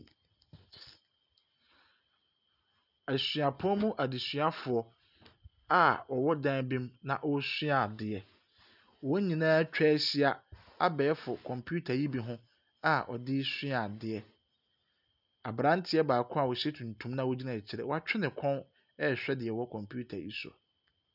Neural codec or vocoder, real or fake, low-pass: none; real; 5.4 kHz